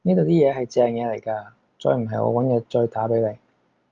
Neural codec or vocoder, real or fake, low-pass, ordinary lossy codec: none; real; 7.2 kHz; Opus, 32 kbps